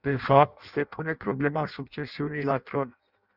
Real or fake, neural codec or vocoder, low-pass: fake; codec, 16 kHz in and 24 kHz out, 0.6 kbps, FireRedTTS-2 codec; 5.4 kHz